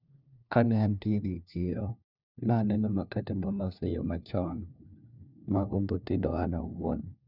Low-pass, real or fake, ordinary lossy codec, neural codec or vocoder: 5.4 kHz; fake; none; codec, 16 kHz, 1 kbps, FunCodec, trained on LibriTTS, 50 frames a second